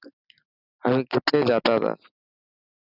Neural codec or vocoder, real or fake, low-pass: none; real; 5.4 kHz